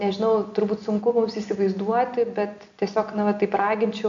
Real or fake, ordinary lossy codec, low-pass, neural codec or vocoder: real; MP3, 64 kbps; 7.2 kHz; none